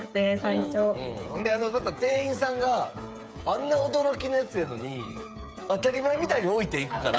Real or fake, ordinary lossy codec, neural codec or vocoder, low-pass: fake; none; codec, 16 kHz, 8 kbps, FreqCodec, smaller model; none